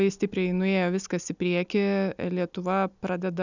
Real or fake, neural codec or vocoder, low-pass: real; none; 7.2 kHz